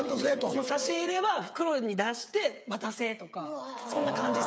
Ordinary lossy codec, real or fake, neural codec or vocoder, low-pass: none; fake; codec, 16 kHz, 8 kbps, FreqCodec, smaller model; none